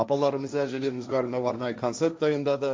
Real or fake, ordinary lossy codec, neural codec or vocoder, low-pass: fake; none; codec, 16 kHz, 1.1 kbps, Voila-Tokenizer; none